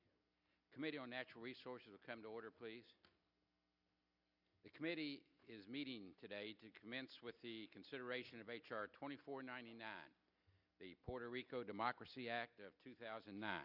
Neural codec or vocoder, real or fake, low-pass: none; real; 5.4 kHz